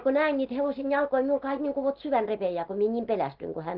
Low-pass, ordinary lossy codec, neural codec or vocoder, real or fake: 5.4 kHz; Opus, 16 kbps; none; real